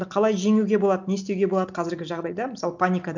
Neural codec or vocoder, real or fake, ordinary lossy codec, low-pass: none; real; none; 7.2 kHz